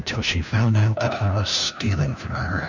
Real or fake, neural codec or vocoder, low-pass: fake; codec, 16 kHz, 1 kbps, FunCodec, trained on LibriTTS, 50 frames a second; 7.2 kHz